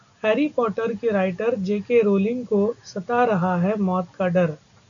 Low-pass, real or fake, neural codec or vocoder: 7.2 kHz; real; none